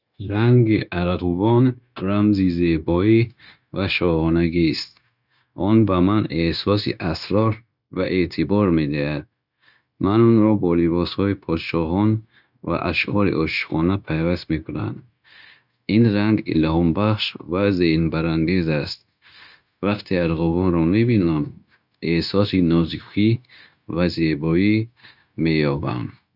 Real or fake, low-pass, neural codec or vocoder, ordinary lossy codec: fake; 5.4 kHz; codec, 16 kHz, 0.9 kbps, LongCat-Audio-Codec; none